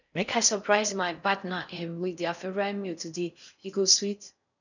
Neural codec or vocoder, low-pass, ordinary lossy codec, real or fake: codec, 16 kHz in and 24 kHz out, 0.6 kbps, FocalCodec, streaming, 4096 codes; 7.2 kHz; none; fake